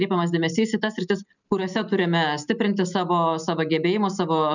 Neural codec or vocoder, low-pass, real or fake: none; 7.2 kHz; real